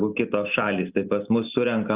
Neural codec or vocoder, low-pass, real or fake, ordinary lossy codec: none; 3.6 kHz; real; Opus, 32 kbps